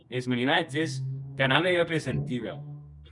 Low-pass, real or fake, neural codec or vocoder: 10.8 kHz; fake; codec, 24 kHz, 0.9 kbps, WavTokenizer, medium music audio release